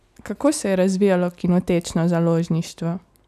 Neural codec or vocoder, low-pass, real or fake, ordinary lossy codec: none; 14.4 kHz; real; none